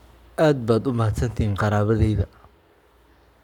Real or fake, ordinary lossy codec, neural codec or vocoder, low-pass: fake; none; codec, 44.1 kHz, 7.8 kbps, Pupu-Codec; 19.8 kHz